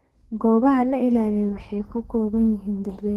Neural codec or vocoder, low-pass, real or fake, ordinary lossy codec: codec, 32 kHz, 1.9 kbps, SNAC; 14.4 kHz; fake; Opus, 16 kbps